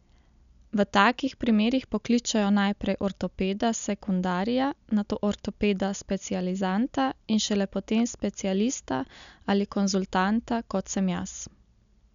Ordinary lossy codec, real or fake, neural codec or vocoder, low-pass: none; real; none; 7.2 kHz